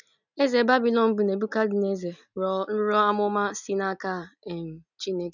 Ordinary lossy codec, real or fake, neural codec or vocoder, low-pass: none; real; none; 7.2 kHz